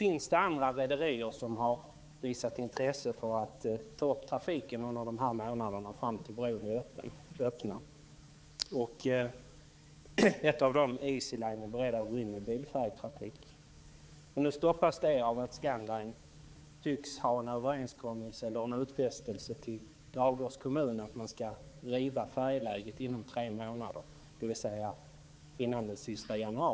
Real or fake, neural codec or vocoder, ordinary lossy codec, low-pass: fake; codec, 16 kHz, 4 kbps, X-Codec, HuBERT features, trained on balanced general audio; none; none